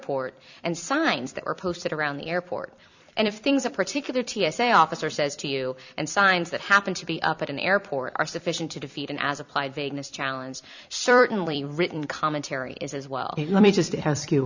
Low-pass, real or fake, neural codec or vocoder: 7.2 kHz; fake; vocoder, 44.1 kHz, 128 mel bands every 256 samples, BigVGAN v2